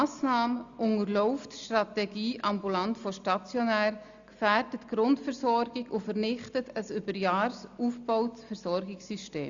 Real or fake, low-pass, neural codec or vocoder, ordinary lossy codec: real; 7.2 kHz; none; Opus, 64 kbps